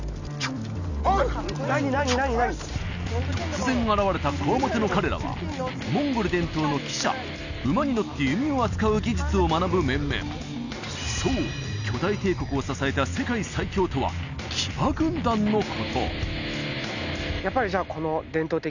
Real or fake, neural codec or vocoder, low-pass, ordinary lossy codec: real; none; 7.2 kHz; none